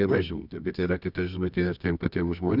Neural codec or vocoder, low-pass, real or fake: codec, 24 kHz, 0.9 kbps, WavTokenizer, medium music audio release; 5.4 kHz; fake